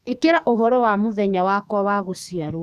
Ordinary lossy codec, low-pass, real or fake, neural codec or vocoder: none; 14.4 kHz; fake; codec, 44.1 kHz, 2.6 kbps, SNAC